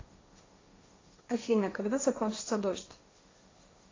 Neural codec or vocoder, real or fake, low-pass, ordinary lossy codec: codec, 16 kHz, 1.1 kbps, Voila-Tokenizer; fake; 7.2 kHz; AAC, 32 kbps